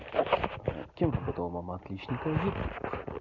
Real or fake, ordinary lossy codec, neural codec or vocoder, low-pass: real; none; none; 7.2 kHz